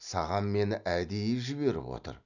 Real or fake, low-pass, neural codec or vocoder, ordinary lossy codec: real; 7.2 kHz; none; none